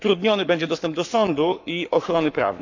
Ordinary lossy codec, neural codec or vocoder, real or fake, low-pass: none; codec, 44.1 kHz, 7.8 kbps, Pupu-Codec; fake; 7.2 kHz